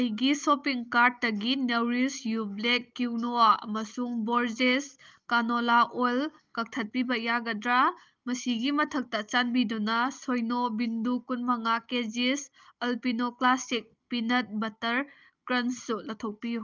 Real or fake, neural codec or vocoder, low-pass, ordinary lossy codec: real; none; 7.2 kHz; Opus, 24 kbps